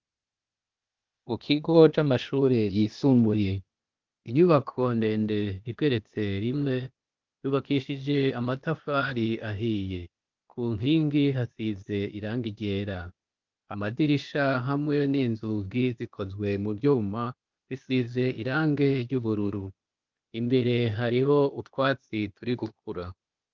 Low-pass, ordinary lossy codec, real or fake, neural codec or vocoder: 7.2 kHz; Opus, 24 kbps; fake; codec, 16 kHz, 0.8 kbps, ZipCodec